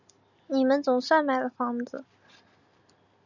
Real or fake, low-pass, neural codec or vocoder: real; 7.2 kHz; none